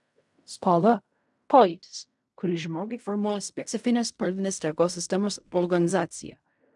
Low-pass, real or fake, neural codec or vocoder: 10.8 kHz; fake; codec, 16 kHz in and 24 kHz out, 0.4 kbps, LongCat-Audio-Codec, fine tuned four codebook decoder